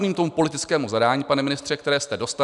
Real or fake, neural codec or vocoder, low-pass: real; none; 10.8 kHz